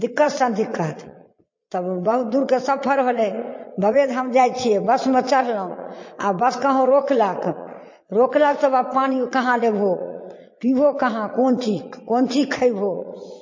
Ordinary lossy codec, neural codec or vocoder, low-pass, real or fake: MP3, 32 kbps; vocoder, 22.05 kHz, 80 mel bands, Vocos; 7.2 kHz; fake